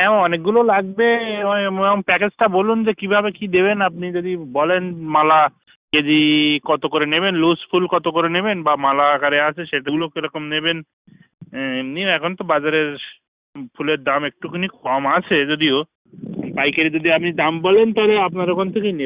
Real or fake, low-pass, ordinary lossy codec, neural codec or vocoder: real; 3.6 kHz; Opus, 64 kbps; none